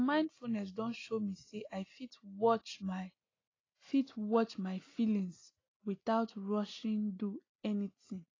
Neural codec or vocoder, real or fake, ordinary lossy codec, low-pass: none; real; AAC, 32 kbps; 7.2 kHz